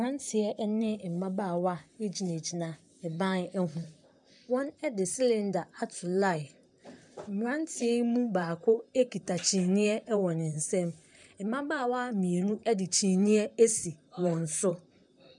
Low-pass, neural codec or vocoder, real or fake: 10.8 kHz; vocoder, 44.1 kHz, 128 mel bands, Pupu-Vocoder; fake